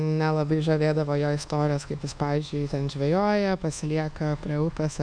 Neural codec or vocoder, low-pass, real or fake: codec, 24 kHz, 1.2 kbps, DualCodec; 9.9 kHz; fake